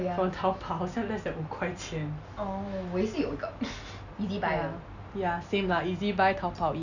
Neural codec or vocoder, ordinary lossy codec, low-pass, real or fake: none; none; 7.2 kHz; real